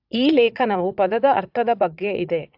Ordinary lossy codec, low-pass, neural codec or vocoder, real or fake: AAC, 48 kbps; 5.4 kHz; vocoder, 22.05 kHz, 80 mel bands, Vocos; fake